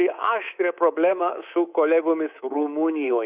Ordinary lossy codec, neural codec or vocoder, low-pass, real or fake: Opus, 64 kbps; codec, 24 kHz, 3.1 kbps, DualCodec; 3.6 kHz; fake